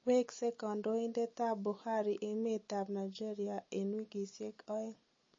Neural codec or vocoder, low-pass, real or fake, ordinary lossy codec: none; 7.2 kHz; real; MP3, 32 kbps